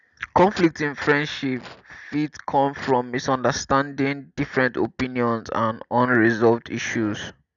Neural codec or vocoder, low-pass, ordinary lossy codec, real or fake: none; 7.2 kHz; none; real